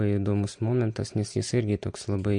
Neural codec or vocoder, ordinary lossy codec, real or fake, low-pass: none; MP3, 48 kbps; real; 9.9 kHz